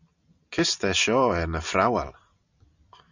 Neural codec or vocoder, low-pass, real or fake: none; 7.2 kHz; real